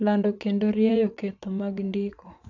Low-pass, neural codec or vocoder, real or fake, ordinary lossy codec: 7.2 kHz; vocoder, 44.1 kHz, 80 mel bands, Vocos; fake; AAC, 48 kbps